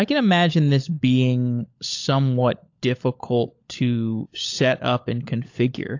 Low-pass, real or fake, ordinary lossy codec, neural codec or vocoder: 7.2 kHz; fake; AAC, 48 kbps; codec, 16 kHz, 16 kbps, FunCodec, trained on LibriTTS, 50 frames a second